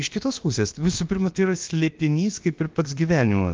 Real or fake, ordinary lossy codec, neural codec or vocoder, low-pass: fake; Opus, 24 kbps; codec, 16 kHz, about 1 kbps, DyCAST, with the encoder's durations; 7.2 kHz